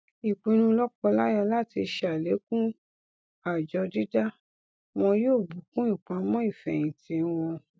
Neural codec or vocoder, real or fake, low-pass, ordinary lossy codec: none; real; none; none